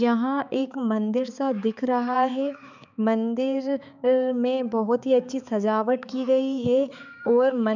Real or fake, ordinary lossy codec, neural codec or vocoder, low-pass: fake; none; codec, 16 kHz, 4 kbps, X-Codec, HuBERT features, trained on balanced general audio; 7.2 kHz